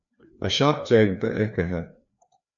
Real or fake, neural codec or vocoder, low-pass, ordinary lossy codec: fake; codec, 16 kHz, 2 kbps, FreqCodec, larger model; 7.2 kHz; AAC, 64 kbps